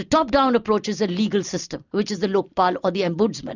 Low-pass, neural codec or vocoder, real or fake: 7.2 kHz; none; real